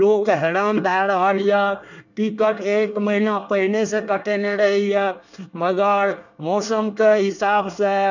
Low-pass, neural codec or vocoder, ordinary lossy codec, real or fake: 7.2 kHz; codec, 24 kHz, 1 kbps, SNAC; none; fake